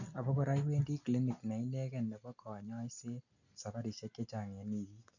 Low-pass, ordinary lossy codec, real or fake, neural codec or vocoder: 7.2 kHz; none; real; none